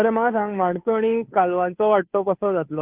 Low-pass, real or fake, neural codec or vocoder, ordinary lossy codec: 3.6 kHz; fake; codec, 16 kHz, 2 kbps, FunCodec, trained on Chinese and English, 25 frames a second; Opus, 32 kbps